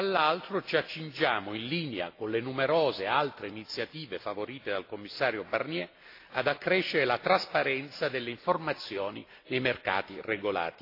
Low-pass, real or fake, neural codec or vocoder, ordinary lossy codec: 5.4 kHz; real; none; AAC, 32 kbps